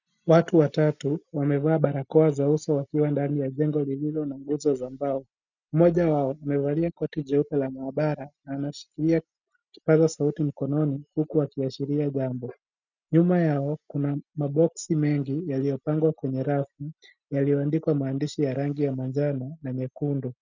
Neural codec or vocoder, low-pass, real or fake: none; 7.2 kHz; real